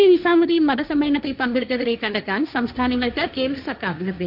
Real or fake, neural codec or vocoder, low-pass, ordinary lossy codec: fake; codec, 16 kHz, 1.1 kbps, Voila-Tokenizer; 5.4 kHz; AAC, 48 kbps